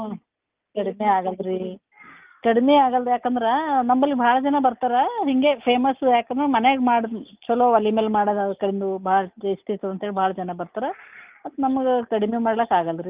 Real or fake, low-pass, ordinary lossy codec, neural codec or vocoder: real; 3.6 kHz; Opus, 24 kbps; none